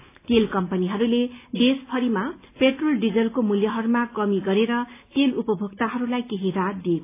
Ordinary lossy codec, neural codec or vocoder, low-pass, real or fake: AAC, 24 kbps; none; 3.6 kHz; real